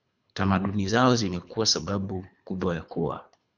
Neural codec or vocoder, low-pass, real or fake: codec, 24 kHz, 3 kbps, HILCodec; 7.2 kHz; fake